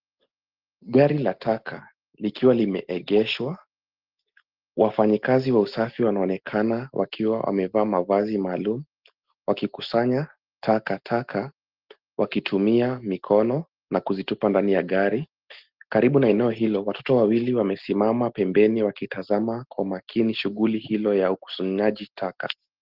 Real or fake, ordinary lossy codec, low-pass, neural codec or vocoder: real; Opus, 16 kbps; 5.4 kHz; none